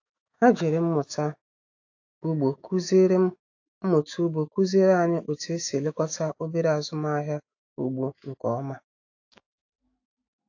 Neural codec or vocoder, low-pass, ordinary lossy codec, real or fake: autoencoder, 48 kHz, 128 numbers a frame, DAC-VAE, trained on Japanese speech; 7.2 kHz; AAC, 48 kbps; fake